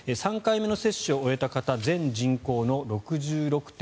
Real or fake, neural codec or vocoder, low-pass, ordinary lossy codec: real; none; none; none